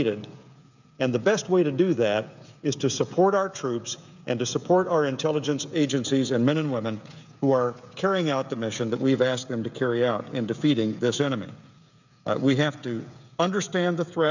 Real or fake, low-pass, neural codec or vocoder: fake; 7.2 kHz; codec, 16 kHz, 16 kbps, FreqCodec, smaller model